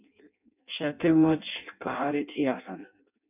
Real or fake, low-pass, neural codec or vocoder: fake; 3.6 kHz; codec, 16 kHz in and 24 kHz out, 0.6 kbps, FireRedTTS-2 codec